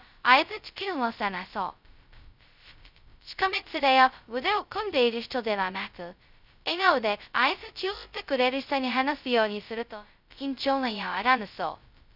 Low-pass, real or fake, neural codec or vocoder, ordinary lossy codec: 5.4 kHz; fake; codec, 16 kHz, 0.2 kbps, FocalCodec; none